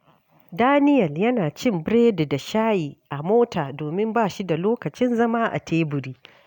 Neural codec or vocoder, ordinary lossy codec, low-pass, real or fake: none; none; 19.8 kHz; real